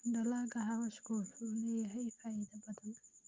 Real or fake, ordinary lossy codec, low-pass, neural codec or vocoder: real; Opus, 24 kbps; 7.2 kHz; none